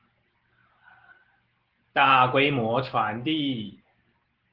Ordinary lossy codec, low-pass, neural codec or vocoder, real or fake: Opus, 16 kbps; 5.4 kHz; none; real